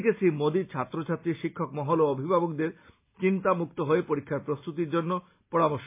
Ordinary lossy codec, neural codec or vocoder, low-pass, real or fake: MP3, 24 kbps; none; 3.6 kHz; real